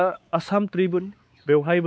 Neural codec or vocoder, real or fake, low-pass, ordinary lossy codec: codec, 16 kHz, 4 kbps, X-Codec, WavLM features, trained on Multilingual LibriSpeech; fake; none; none